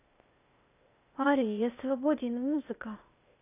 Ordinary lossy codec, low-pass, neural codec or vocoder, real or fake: none; 3.6 kHz; codec, 16 kHz, 0.8 kbps, ZipCodec; fake